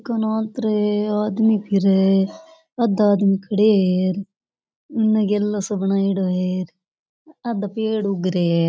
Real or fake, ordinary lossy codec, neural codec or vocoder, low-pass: real; none; none; none